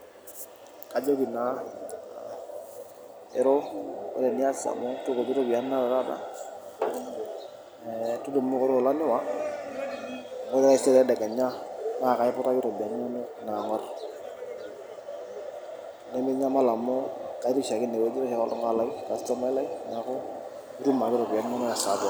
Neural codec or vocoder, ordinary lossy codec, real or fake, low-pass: none; none; real; none